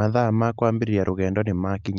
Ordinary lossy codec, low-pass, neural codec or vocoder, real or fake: Opus, 32 kbps; 7.2 kHz; none; real